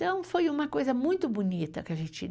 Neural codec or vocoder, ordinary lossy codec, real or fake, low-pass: none; none; real; none